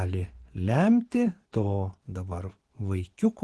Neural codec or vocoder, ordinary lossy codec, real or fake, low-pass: vocoder, 24 kHz, 100 mel bands, Vocos; Opus, 16 kbps; fake; 10.8 kHz